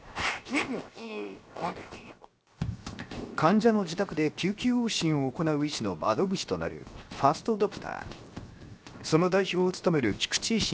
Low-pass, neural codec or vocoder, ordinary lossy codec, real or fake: none; codec, 16 kHz, 0.7 kbps, FocalCodec; none; fake